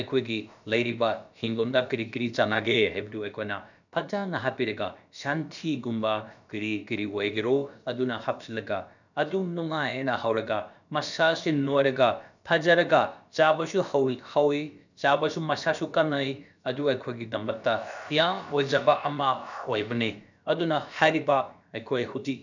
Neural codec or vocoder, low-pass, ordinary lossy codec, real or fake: codec, 16 kHz, about 1 kbps, DyCAST, with the encoder's durations; 7.2 kHz; none; fake